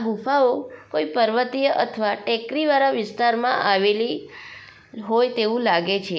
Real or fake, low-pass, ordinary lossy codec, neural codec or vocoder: real; none; none; none